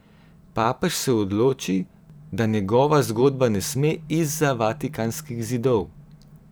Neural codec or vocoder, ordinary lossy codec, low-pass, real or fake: vocoder, 44.1 kHz, 128 mel bands every 512 samples, BigVGAN v2; none; none; fake